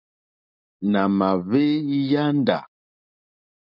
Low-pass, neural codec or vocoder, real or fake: 5.4 kHz; none; real